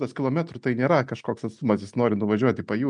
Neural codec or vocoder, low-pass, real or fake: none; 9.9 kHz; real